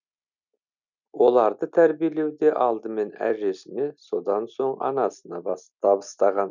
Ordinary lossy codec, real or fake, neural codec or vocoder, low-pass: none; real; none; 7.2 kHz